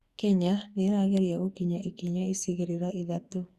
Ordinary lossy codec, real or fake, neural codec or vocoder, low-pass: Opus, 64 kbps; fake; codec, 44.1 kHz, 2.6 kbps, SNAC; 14.4 kHz